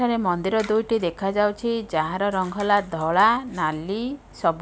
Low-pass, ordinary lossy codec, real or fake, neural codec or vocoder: none; none; real; none